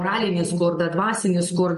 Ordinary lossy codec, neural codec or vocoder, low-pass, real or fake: MP3, 48 kbps; none; 14.4 kHz; real